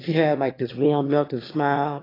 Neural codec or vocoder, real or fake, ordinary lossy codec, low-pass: autoencoder, 22.05 kHz, a latent of 192 numbers a frame, VITS, trained on one speaker; fake; AAC, 24 kbps; 5.4 kHz